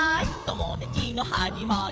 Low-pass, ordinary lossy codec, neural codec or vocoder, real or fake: none; none; codec, 16 kHz, 4 kbps, FreqCodec, larger model; fake